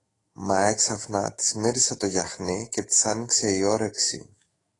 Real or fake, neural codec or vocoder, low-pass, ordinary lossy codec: fake; autoencoder, 48 kHz, 128 numbers a frame, DAC-VAE, trained on Japanese speech; 10.8 kHz; AAC, 32 kbps